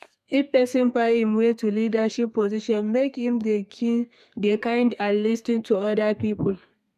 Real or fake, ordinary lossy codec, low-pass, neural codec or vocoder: fake; none; 14.4 kHz; codec, 32 kHz, 1.9 kbps, SNAC